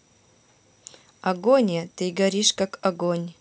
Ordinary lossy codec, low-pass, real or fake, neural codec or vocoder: none; none; real; none